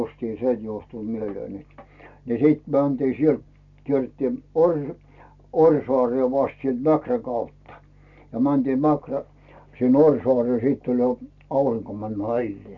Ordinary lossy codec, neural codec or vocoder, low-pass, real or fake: none; none; 7.2 kHz; real